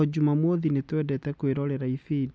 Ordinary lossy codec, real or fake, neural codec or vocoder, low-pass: none; real; none; none